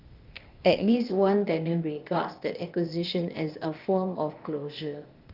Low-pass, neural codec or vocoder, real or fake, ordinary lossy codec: 5.4 kHz; codec, 16 kHz, 0.8 kbps, ZipCodec; fake; Opus, 32 kbps